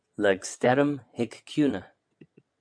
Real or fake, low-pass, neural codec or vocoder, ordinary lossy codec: fake; 9.9 kHz; vocoder, 22.05 kHz, 80 mel bands, WaveNeXt; MP3, 64 kbps